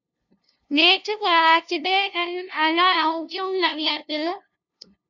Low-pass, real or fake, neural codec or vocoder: 7.2 kHz; fake; codec, 16 kHz, 0.5 kbps, FunCodec, trained on LibriTTS, 25 frames a second